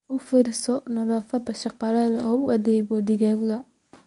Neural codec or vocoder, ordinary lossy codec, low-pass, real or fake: codec, 24 kHz, 0.9 kbps, WavTokenizer, medium speech release version 1; MP3, 96 kbps; 10.8 kHz; fake